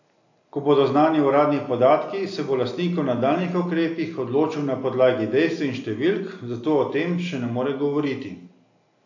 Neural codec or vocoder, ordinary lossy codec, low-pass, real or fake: none; AAC, 48 kbps; 7.2 kHz; real